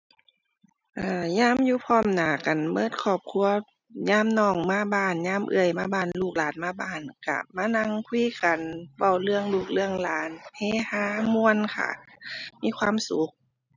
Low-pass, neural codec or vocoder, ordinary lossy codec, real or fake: 7.2 kHz; none; none; real